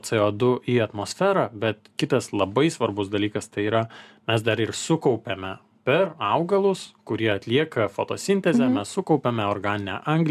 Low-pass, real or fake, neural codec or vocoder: 14.4 kHz; real; none